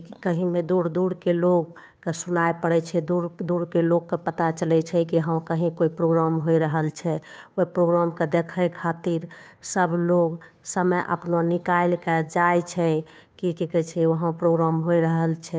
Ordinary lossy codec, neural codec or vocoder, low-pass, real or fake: none; codec, 16 kHz, 2 kbps, FunCodec, trained on Chinese and English, 25 frames a second; none; fake